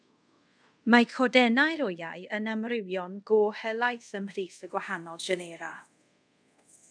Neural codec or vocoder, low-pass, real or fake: codec, 24 kHz, 0.5 kbps, DualCodec; 9.9 kHz; fake